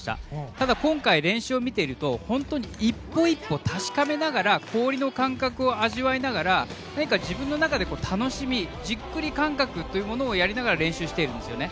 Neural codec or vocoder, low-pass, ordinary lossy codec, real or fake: none; none; none; real